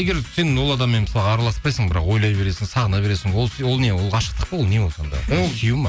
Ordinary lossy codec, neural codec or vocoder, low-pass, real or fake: none; none; none; real